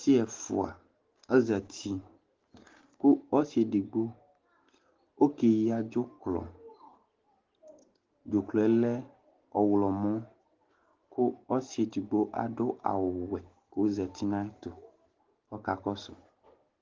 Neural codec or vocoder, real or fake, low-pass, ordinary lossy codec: none; real; 7.2 kHz; Opus, 16 kbps